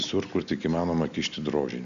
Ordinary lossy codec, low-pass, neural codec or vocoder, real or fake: MP3, 64 kbps; 7.2 kHz; none; real